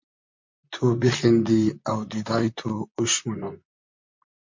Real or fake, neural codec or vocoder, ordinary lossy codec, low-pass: fake; vocoder, 44.1 kHz, 128 mel bands, Pupu-Vocoder; MP3, 48 kbps; 7.2 kHz